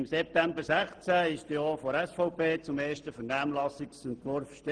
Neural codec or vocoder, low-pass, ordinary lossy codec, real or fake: none; 9.9 kHz; Opus, 16 kbps; real